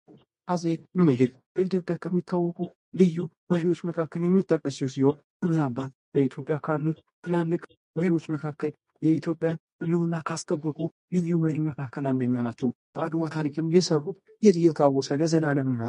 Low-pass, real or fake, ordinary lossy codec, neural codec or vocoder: 10.8 kHz; fake; MP3, 48 kbps; codec, 24 kHz, 0.9 kbps, WavTokenizer, medium music audio release